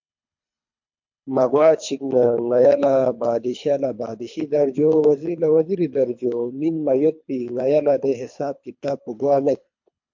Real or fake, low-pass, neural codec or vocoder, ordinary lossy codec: fake; 7.2 kHz; codec, 24 kHz, 3 kbps, HILCodec; MP3, 48 kbps